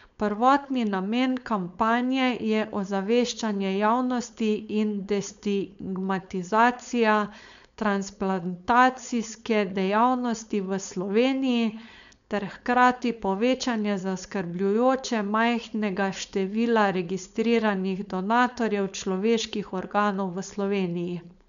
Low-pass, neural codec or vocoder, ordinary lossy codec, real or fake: 7.2 kHz; codec, 16 kHz, 4.8 kbps, FACodec; none; fake